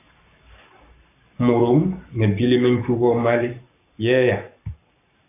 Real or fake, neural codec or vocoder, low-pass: fake; codec, 44.1 kHz, 7.8 kbps, Pupu-Codec; 3.6 kHz